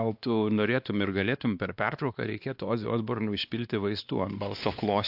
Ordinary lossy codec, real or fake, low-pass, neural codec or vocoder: AAC, 48 kbps; fake; 5.4 kHz; codec, 16 kHz, 2 kbps, X-Codec, WavLM features, trained on Multilingual LibriSpeech